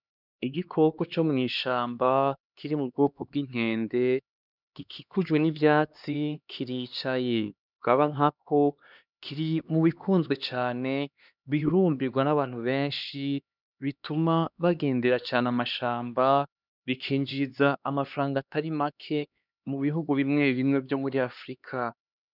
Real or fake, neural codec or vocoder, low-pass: fake; codec, 16 kHz, 2 kbps, X-Codec, HuBERT features, trained on LibriSpeech; 5.4 kHz